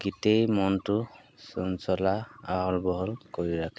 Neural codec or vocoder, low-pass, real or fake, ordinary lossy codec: none; none; real; none